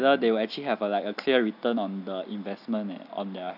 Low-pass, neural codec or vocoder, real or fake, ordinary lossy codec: 5.4 kHz; none; real; AAC, 48 kbps